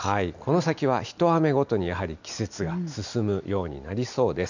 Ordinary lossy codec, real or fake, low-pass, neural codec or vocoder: none; real; 7.2 kHz; none